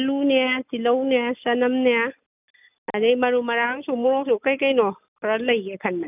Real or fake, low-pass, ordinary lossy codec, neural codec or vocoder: real; 3.6 kHz; none; none